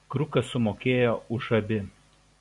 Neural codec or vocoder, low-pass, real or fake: none; 10.8 kHz; real